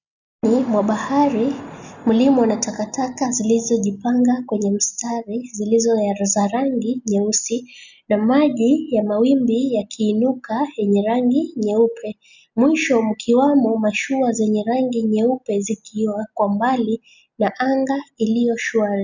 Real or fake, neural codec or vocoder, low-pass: real; none; 7.2 kHz